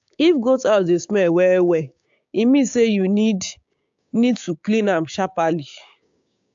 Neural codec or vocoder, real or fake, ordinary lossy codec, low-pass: codec, 16 kHz, 6 kbps, DAC; fake; AAC, 64 kbps; 7.2 kHz